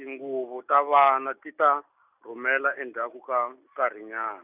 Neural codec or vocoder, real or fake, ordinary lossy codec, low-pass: none; real; none; 3.6 kHz